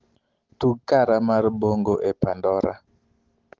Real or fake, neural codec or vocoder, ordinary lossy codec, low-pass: real; none; Opus, 16 kbps; 7.2 kHz